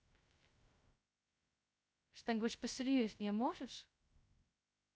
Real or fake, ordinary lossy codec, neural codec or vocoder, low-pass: fake; none; codec, 16 kHz, 0.2 kbps, FocalCodec; none